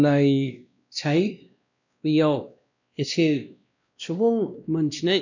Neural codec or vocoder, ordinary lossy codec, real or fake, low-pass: codec, 16 kHz, 1 kbps, X-Codec, WavLM features, trained on Multilingual LibriSpeech; none; fake; 7.2 kHz